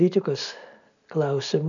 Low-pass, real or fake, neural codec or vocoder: 7.2 kHz; real; none